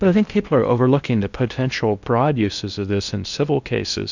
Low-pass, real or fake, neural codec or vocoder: 7.2 kHz; fake; codec, 16 kHz in and 24 kHz out, 0.8 kbps, FocalCodec, streaming, 65536 codes